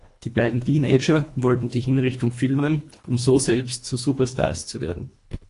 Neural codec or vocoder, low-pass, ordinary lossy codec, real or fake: codec, 24 kHz, 1.5 kbps, HILCodec; 10.8 kHz; AAC, 48 kbps; fake